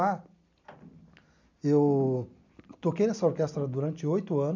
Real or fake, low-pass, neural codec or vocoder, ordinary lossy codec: real; 7.2 kHz; none; none